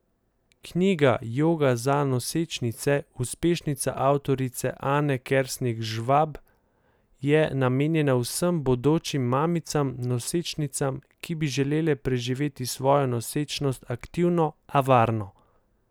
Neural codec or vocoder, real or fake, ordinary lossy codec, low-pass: none; real; none; none